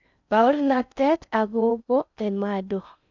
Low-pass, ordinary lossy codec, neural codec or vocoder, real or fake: 7.2 kHz; none; codec, 16 kHz in and 24 kHz out, 0.6 kbps, FocalCodec, streaming, 4096 codes; fake